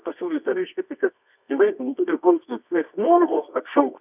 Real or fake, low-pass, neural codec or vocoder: fake; 3.6 kHz; codec, 24 kHz, 0.9 kbps, WavTokenizer, medium music audio release